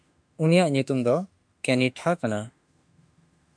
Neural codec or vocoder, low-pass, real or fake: autoencoder, 48 kHz, 32 numbers a frame, DAC-VAE, trained on Japanese speech; 9.9 kHz; fake